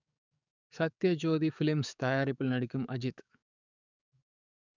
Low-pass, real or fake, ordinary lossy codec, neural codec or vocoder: 7.2 kHz; fake; none; codec, 44.1 kHz, 7.8 kbps, DAC